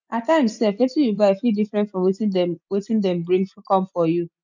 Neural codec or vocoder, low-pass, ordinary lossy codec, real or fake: none; 7.2 kHz; none; real